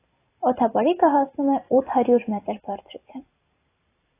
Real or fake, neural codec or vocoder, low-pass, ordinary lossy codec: fake; vocoder, 44.1 kHz, 128 mel bands every 256 samples, BigVGAN v2; 3.6 kHz; AAC, 24 kbps